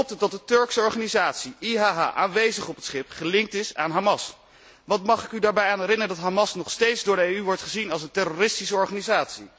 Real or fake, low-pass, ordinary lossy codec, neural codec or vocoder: real; none; none; none